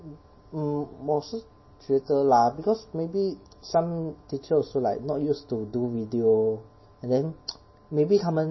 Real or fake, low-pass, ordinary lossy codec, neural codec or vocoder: real; 7.2 kHz; MP3, 24 kbps; none